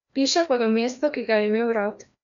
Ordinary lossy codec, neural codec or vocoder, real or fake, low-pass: MP3, 96 kbps; codec, 16 kHz, 1 kbps, FreqCodec, larger model; fake; 7.2 kHz